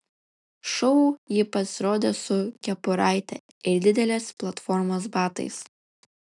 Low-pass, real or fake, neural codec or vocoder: 10.8 kHz; real; none